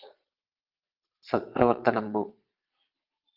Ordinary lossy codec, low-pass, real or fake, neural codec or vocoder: Opus, 32 kbps; 5.4 kHz; fake; vocoder, 22.05 kHz, 80 mel bands, Vocos